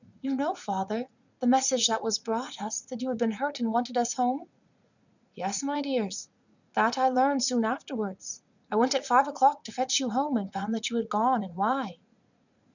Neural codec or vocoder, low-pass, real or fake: vocoder, 44.1 kHz, 80 mel bands, Vocos; 7.2 kHz; fake